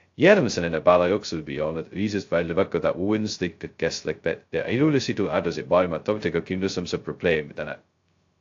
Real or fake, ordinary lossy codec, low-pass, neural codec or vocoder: fake; AAC, 48 kbps; 7.2 kHz; codec, 16 kHz, 0.2 kbps, FocalCodec